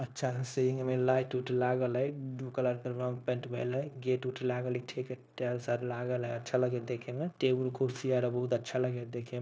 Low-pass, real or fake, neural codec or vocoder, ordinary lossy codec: none; fake; codec, 16 kHz, 0.9 kbps, LongCat-Audio-Codec; none